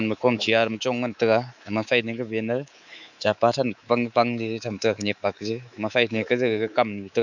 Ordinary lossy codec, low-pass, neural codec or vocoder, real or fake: none; 7.2 kHz; codec, 24 kHz, 3.1 kbps, DualCodec; fake